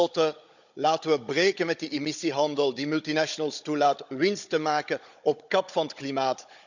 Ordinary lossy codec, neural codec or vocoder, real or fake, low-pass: none; codec, 16 kHz, 16 kbps, FunCodec, trained on Chinese and English, 50 frames a second; fake; 7.2 kHz